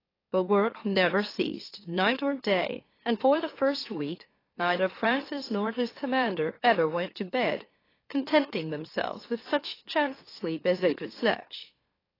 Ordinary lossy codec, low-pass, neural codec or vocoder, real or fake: AAC, 24 kbps; 5.4 kHz; autoencoder, 44.1 kHz, a latent of 192 numbers a frame, MeloTTS; fake